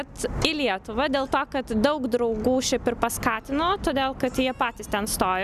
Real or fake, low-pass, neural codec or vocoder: real; 14.4 kHz; none